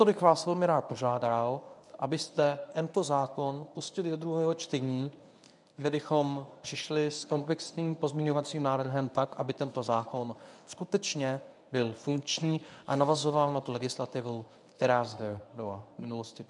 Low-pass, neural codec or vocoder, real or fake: 10.8 kHz; codec, 24 kHz, 0.9 kbps, WavTokenizer, medium speech release version 1; fake